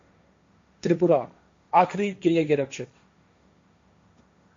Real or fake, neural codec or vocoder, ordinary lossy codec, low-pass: fake; codec, 16 kHz, 1.1 kbps, Voila-Tokenizer; AAC, 48 kbps; 7.2 kHz